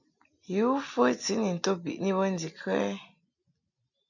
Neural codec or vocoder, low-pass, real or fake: none; 7.2 kHz; real